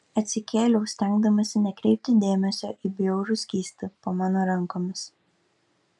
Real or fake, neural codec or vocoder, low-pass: real; none; 10.8 kHz